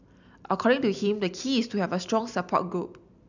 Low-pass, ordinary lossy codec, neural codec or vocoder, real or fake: 7.2 kHz; none; none; real